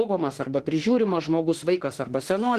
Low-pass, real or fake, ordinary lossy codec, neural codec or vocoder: 14.4 kHz; fake; Opus, 16 kbps; autoencoder, 48 kHz, 32 numbers a frame, DAC-VAE, trained on Japanese speech